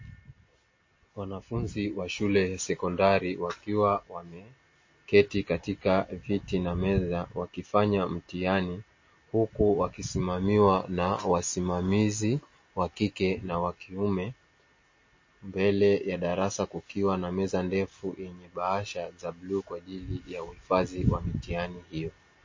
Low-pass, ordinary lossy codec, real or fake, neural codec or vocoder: 7.2 kHz; MP3, 32 kbps; real; none